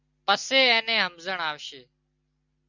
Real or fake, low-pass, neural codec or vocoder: real; 7.2 kHz; none